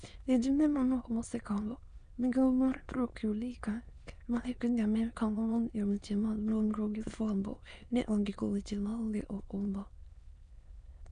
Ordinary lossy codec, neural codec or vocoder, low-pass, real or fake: none; autoencoder, 22.05 kHz, a latent of 192 numbers a frame, VITS, trained on many speakers; 9.9 kHz; fake